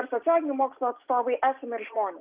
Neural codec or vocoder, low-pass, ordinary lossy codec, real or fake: none; 3.6 kHz; Opus, 32 kbps; real